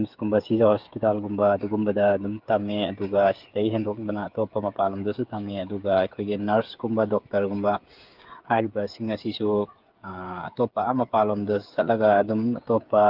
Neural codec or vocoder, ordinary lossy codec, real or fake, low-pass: codec, 16 kHz, 8 kbps, FreqCodec, smaller model; Opus, 24 kbps; fake; 5.4 kHz